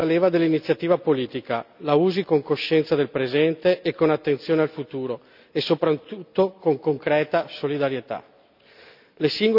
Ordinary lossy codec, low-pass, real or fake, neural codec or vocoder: none; 5.4 kHz; real; none